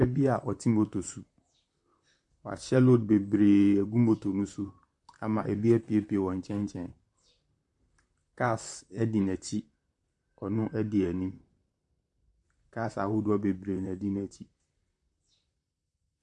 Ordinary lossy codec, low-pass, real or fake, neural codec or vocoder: MP3, 64 kbps; 10.8 kHz; fake; vocoder, 44.1 kHz, 128 mel bands, Pupu-Vocoder